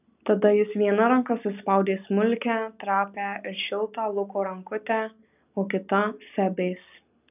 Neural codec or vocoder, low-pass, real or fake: none; 3.6 kHz; real